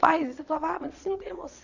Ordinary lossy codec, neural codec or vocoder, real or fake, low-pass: none; vocoder, 22.05 kHz, 80 mel bands, Vocos; fake; 7.2 kHz